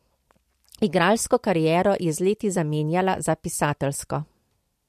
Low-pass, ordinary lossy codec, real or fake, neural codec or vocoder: 14.4 kHz; MP3, 64 kbps; real; none